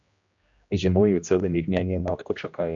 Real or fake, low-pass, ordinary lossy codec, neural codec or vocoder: fake; 7.2 kHz; MP3, 64 kbps; codec, 16 kHz, 1 kbps, X-Codec, HuBERT features, trained on general audio